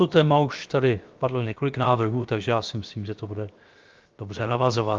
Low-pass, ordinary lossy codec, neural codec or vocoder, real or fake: 7.2 kHz; Opus, 32 kbps; codec, 16 kHz, 0.7 kbps, FocalCodec; fake